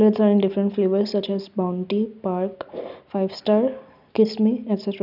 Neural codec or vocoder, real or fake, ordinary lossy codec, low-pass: none; real; none; 5.4 kHz